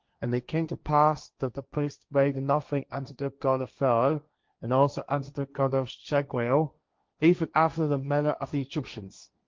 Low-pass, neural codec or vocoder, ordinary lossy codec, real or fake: 7.2 kHz; codec, 16 kHz, 1.1 kbps, Voila-Tokenizer; Opus, 32 kbps; fake